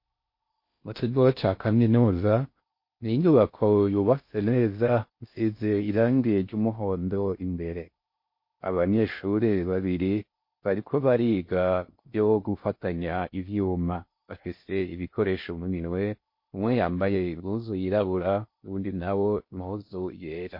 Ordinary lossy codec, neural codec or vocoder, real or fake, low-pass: MP3, 32 kbps; codec, 16 kHz in and 24 kHz out, 0.6 kbps, FocalCodec, streaming, 4096 codes; fake; 5.4 kHz